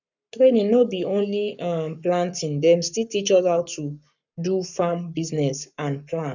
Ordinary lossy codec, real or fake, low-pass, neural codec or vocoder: none; fake; 7.2 kHz; codec, 44.1 kHz, 7.8 kbps, Pupu-Codec